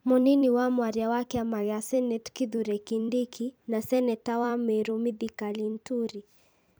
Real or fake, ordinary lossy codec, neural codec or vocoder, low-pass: fake; none; vocoder, 44.1 kHz, 128 mel bands every 256 samples, BigVGAN v2; none